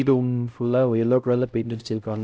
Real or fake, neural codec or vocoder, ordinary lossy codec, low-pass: fake; codec, 16 kHz, 0.5 kbps, X-Codec, HuBERT features, trained on LibriSpeech; none; none